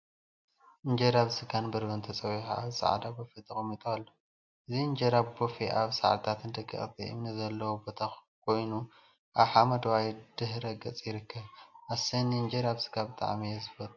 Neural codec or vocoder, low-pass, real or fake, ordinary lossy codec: none; 7.2 kHz; real; MP3, 48 kbps